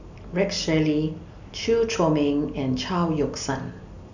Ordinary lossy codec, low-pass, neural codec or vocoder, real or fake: none; 7.2 kHz; none; real